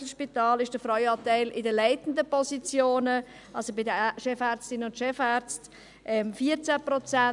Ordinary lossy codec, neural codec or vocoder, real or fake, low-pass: none; none; real; 10.8 kHz